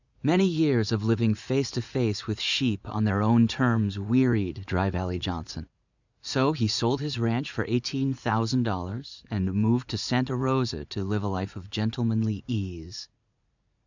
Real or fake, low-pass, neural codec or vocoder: fake; 7.2 kHz; vocoder, 44.1 kHz, 80 mel bands, Vocos